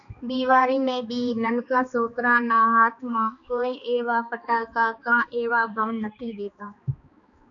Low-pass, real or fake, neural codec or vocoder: 7.2 kHz; fake; codec, 16 kHz, 2 kbps, X-Codec, HuBERT features, trained on balanced general audio